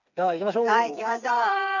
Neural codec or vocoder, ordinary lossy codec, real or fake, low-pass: codec, 16 kHz, 4 kbps, FreqCodec, smaller model; none; fake; 7.2 kHz